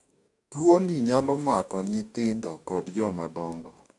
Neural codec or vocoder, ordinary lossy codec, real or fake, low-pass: codec, 44.1 kHz, 2.6 kbps, DAC; none; fake; 10.8 kHz